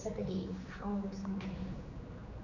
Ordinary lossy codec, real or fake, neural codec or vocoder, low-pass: none; fake; codec, 16 kHz, 4 kbps, X-Codec, HuBERT features, trained on general audio; 7.2 kHz